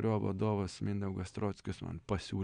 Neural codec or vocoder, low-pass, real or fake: none; 9.9 kHz; real